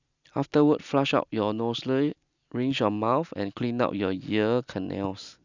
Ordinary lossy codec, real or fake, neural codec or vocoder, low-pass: none; real; none; 7.2 kHz